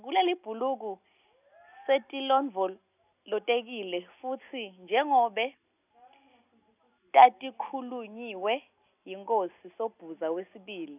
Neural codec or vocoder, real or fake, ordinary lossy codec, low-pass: none; real; none; 3.6 kHz